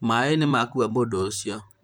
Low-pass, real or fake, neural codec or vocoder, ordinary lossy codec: none; fake; vocoder, 44.1 kHz, 128 mel bands, Pupu-Vocoder; none